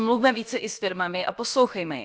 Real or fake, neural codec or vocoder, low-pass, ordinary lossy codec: fake; codec, 16 kHz, about 1 kbps, DyCAST, with the encoder's durations; none; none